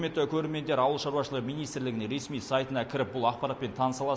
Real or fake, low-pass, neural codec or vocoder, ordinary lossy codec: real; none; none; none